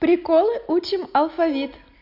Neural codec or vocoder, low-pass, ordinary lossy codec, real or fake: none; 5.4 kHz; Opus, 64 kbps; real